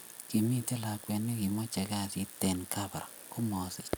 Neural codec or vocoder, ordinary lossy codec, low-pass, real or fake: none; none; none; real